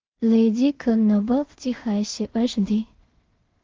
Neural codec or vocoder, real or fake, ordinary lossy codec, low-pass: codec, 16 kHz in and 24 kHz out, 0.4 kbps, LongCat-Audio-Codec, two codebook decoder; fake; Opus, 16 kbps; 7.2 kHz